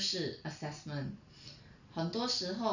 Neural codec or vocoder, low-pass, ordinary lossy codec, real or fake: none; 7.2 kHz; none; real